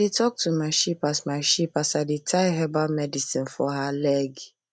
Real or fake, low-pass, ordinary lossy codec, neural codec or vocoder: real; none; none; none